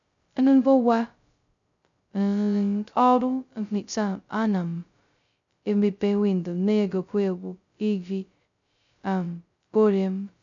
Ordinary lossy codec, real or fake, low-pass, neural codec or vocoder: none; fake; 7.2 kHz; codec, 16 kHz, 0.2 kbps, FocalCodec